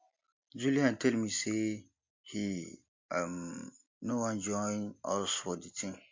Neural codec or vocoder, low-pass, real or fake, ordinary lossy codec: none; 7.2 kHz; real; MP3, 48 kbps